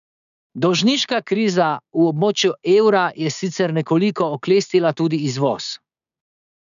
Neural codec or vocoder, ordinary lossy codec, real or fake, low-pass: codec, 16 kHz, 6 kbps, DAC; none; fake; 7.2 kHz